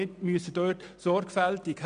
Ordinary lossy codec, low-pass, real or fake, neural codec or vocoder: MP3, 96 kbps; 9.9 kHz; real; none